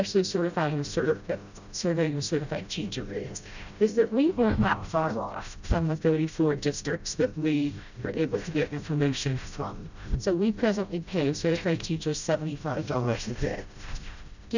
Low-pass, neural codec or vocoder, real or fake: 7.2 kHz; codec, 16 kHz, 0.5 kbps, FreqCodec, smaller model; fake